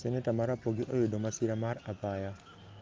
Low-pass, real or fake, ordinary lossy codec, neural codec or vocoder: 7.2 kHz; real; Opus, 24 kbps; none